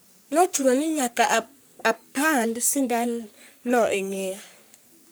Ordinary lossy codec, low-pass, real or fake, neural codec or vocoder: none; none; fake; codec, 44.1 kHz, 3.4 kbps, Pupu-Codec